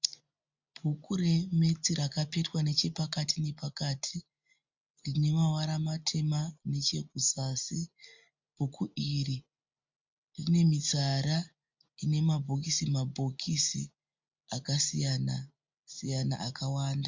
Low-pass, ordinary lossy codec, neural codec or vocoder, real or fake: 7.2 kHz; MP3, 64 kbps; none; real